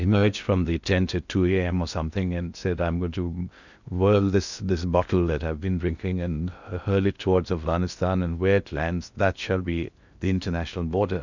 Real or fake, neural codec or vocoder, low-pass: fake; codec, 16 kHz in and 24 kHz out, 0.6 kbps, FocalCodec, streaming, 4096 codes; 7.2 kHz